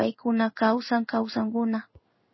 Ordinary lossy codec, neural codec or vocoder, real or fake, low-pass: MP3, 24 kbps; codec, 16 kHz in and 24 kHz out, 1 kbps, XY-Tokenizer; fake; 7.2 kHz